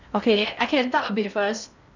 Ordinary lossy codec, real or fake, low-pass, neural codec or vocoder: none; fake; 7.2 kHz; codec, 16 kHz in and 24 kHz out, 0.6 kbps, FocalCodec, streaming, 4096 codes